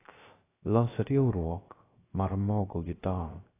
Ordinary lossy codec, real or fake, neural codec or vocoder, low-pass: AAC, 16 kbps; fake; codec, 16 kHz, 0.3 kbps, FocalCodec; 3.6 kHz